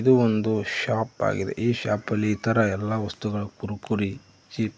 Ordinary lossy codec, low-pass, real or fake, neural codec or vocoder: none; none; real; none